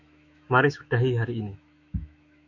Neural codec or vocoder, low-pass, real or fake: autoencoder, 48 kHz, 128 numbers a frame, DAC-VAE, trained on Japanese speech; 7.2 kHz; fake